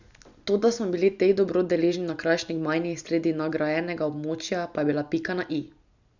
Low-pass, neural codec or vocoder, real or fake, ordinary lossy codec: 7.2 kHz; none; real; none